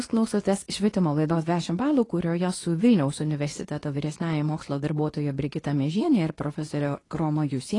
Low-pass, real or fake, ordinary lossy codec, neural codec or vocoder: 10.8 kHz; fake; AAC, 32 kbps; codec, 24 kHz, 0.9 kbps, WavTokenizer, medium speech release version 2